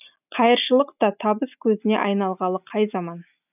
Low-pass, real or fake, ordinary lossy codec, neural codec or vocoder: 3.6 kHz; real; none; none